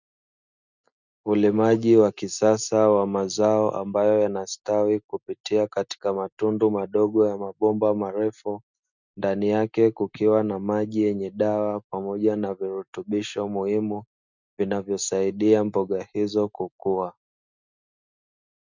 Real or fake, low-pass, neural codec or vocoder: real; 7.2 kHz; none